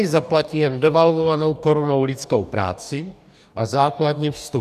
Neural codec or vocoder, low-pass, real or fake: codec, 44.1 kHz, 2.6 kbps, DAC; 14.4 kHz; fake